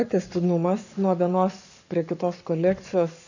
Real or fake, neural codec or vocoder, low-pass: fake; codec, 44.1 kHz, 7.8 kbps, Pupu-Codec; 7.2 kHz